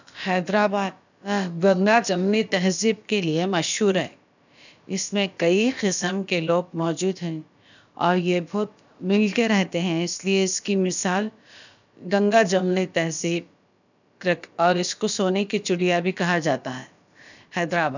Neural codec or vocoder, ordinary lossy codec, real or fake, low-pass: codec, 16 kHz, about 1 kbps, DyCAST, with the encoder's durations; none; fake; 7.2 kHz